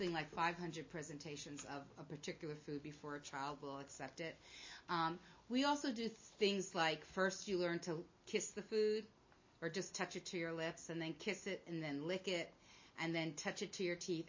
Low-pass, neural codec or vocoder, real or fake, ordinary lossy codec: 7.2 kHz; none; real; MP3, 32 kbps